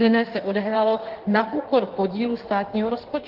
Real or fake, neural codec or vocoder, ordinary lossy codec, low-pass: fake; codec, 16 kHz in and 24 kHz out, 1.1 kbps, FireRedTTS-2 codec; Opus, 16 kbps; 5.4 kHz